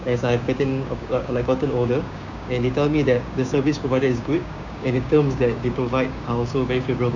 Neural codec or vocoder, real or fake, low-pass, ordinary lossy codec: codec, 16 kHz, 6 kbps, DAC; fake; 7.2 kHz; none